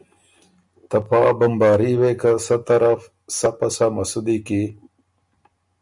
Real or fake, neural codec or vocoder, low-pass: real; none; 10.8 kHz